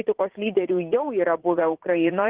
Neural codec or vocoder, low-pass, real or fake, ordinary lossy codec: vocoder, 44.1 kHz, 80 mel bands, Vocos; 3.6 kHz; fake; Opus, 16 kbps